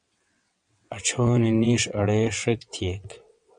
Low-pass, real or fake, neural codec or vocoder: 9.9 kHz; fake; vocoder, 22.05 kHz, 80 mel bands, WaveNeXt